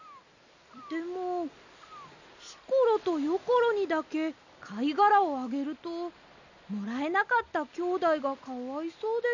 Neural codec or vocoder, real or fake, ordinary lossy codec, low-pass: none; real; none; 7.2 kHz